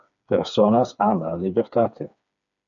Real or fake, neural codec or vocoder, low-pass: fake; codec, 16 kHz, 4 kbps, FreqCodec, smaller model; 7.2 kHz